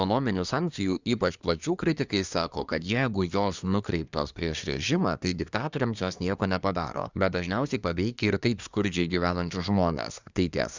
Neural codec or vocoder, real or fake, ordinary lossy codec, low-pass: codec, 24 kHz, 1 kbps, SNAC; fake; Opus, 64 kbps; 7.2 kHz